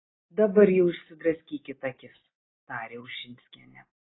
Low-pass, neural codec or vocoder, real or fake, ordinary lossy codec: 7.2 kHz; none; real; AAC, 16 kbps